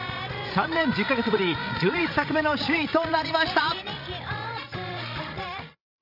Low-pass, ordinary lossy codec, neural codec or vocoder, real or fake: 5.4 kHz; none; codec, 16 kHz, 16 kbps, FreqCodec, larger model; fake